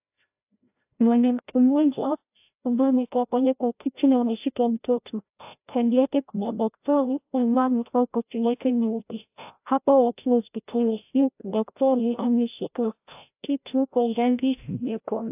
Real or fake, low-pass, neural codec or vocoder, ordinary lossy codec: fake; 3.6 kHz; codec, 16 kHz, 0.5 kbps, FreqCodec, larger model; AAC, 32 kbps